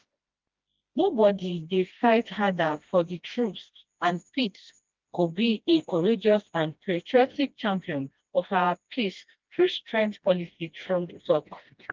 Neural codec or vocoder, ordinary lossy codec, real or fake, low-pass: codec, 16 kHz, 1 kbps, FreqCodec, smaller model; Opus, 32 kbps; fake; 7.2 kHz